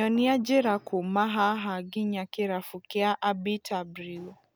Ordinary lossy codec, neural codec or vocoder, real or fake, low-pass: none; none; real; none